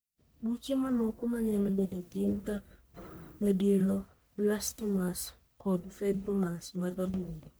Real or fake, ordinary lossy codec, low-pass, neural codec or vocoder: fake; none; none; codec, 44.1 kHz, 1.7 kbps, Pupu-Codec